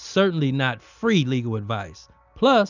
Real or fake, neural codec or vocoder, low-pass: real; none; 7.2 kHz